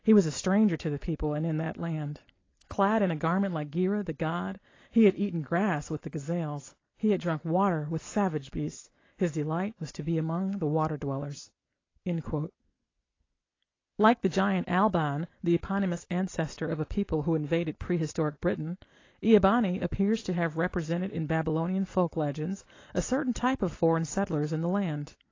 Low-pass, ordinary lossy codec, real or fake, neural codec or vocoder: 7.2 kHz; AAC, 32 kbps; real; none